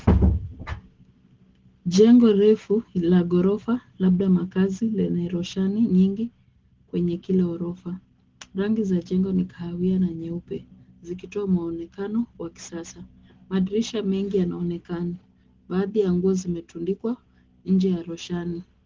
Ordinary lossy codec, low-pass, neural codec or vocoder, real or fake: Opus, 16 kbps; 7.2 kHz; none; real